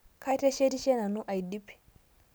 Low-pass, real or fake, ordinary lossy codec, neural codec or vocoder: none; real; none; none